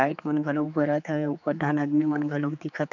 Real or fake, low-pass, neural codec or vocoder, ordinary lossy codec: fake; 7.2 kHz; codec, 16 kHz, 4 kbps, X-Codec, HuBERT features, trained on general audio; none